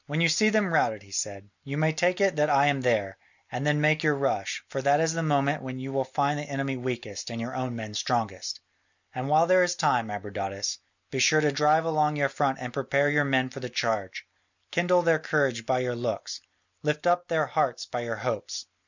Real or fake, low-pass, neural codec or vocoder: real; 7.2 kHz; none